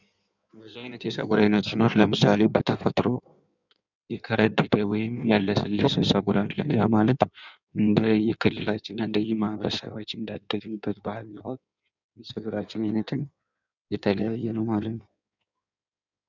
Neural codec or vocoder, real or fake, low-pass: codec, 16 kHz in and 24 kHz out, 1.1 kbps, FireRedTTS-2 codec; fake; 7.2 kHz